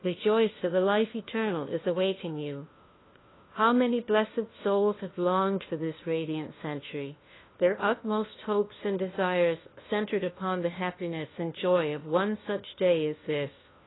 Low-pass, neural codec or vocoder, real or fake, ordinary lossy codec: 7.2 kHz; codec, 16 kHz, 1 kbps, FunCodec, trained on LibriTTS, 50 frames a second; fake; AAC, 16 kbps